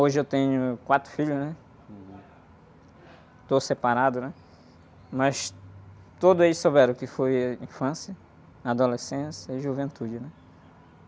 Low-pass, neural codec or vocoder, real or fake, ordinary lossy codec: none; none; real; none